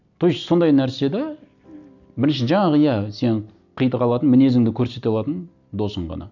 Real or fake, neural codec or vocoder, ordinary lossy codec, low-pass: real; none; none; 7.2 kHz